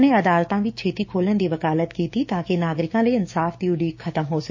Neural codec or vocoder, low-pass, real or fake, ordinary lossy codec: codec, 16 kHz, 6 kbps, DAC; 7.2 kHz; fake; MP3, 32 kbps